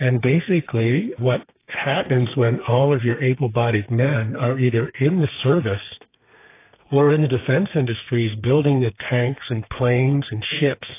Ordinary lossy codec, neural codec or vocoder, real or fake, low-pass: AAC, 24 kbps; codec, 32 kHz, 1.9 kbps, SNAC; fake; 3.6 kHz